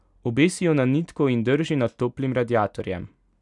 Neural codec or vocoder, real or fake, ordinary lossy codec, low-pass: none; real; none; 10.8 kHz